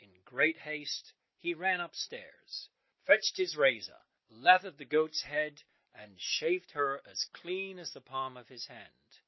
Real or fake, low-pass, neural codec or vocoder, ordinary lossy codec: real; 7.2 kHz; none; MP3, 24 kbps